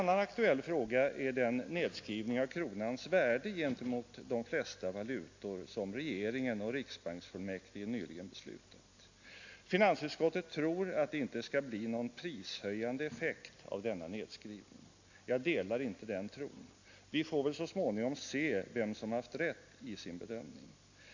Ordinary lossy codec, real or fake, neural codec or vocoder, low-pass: AAC, 48 kbps; real; none; 7.2 kHz